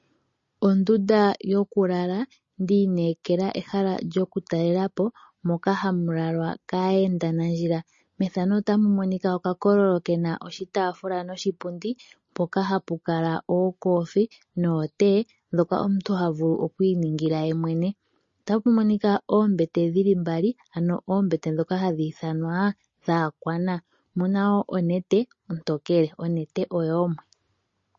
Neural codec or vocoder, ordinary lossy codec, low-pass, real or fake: none; MP3, 32 kbps; 7.2 kHz; real